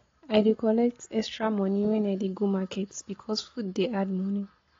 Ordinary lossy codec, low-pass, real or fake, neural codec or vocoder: AAC, 32 kbps; 7.2 kHz; real; none